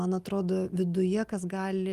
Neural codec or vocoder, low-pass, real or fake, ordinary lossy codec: none; 14.4 kHz; real; Opus, 32 kbps